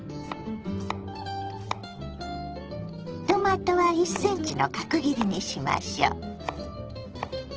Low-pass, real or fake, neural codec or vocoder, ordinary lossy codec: 7.2 kHz; real; none; Opus, 16 kbps